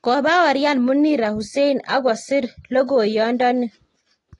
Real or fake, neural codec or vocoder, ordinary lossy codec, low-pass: real; none; AAC, 32 kbps; 10.8 kHz